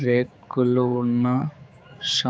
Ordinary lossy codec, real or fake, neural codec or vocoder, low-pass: none; fake; codec, 16 kHz, 4 kbps, X-Codec, HuBERT features, trained on general audio; none